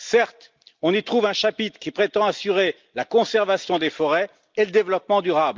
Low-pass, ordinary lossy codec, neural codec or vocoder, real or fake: 7.2 kHz; Opus, 24 kbps; none; real